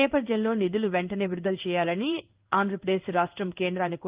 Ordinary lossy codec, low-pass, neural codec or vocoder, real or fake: Opus, 24 kbps; 3.6 kHz; codec, 16 kHz in and 24 kHz out, 1 kbps, XY-Tokenizer; fake